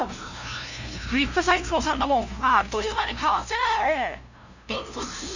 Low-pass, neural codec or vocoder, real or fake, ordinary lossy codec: 7.2 kHz; codec, 16 kHz, 0.5 kbps, FunCodec, trained on LibriTTS, 25 frames a second; fake; none